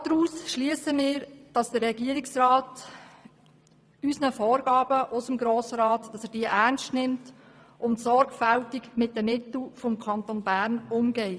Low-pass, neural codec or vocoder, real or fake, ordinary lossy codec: none; vocoder, 22.05 kHz, 80 mel bands, WaveNeXt; fake; none